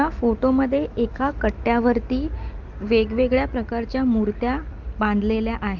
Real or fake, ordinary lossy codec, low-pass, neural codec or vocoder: real; Opus, 32 kbps; 7.2 kHz; none